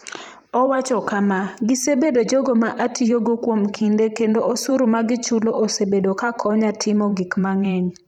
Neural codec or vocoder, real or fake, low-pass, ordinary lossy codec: vocoder, 44.1 kHz, 128 mel bands every 512 samples, BigVGAN v2; fake; 19.8 kHz; none